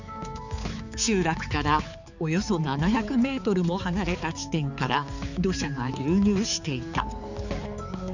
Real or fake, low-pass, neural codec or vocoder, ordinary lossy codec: fake; 7.2 kHz; codec, 16 kHz, 4 kbps, X-Codec, HuBERT features, trained on balanced general audio; none